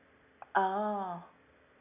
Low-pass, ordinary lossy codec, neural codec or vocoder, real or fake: 3.6 kHz; none; none; real